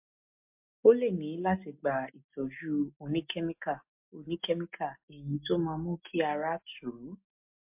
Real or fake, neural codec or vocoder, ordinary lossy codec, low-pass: real; none; MP3, 32 kbps; 3.6 kHz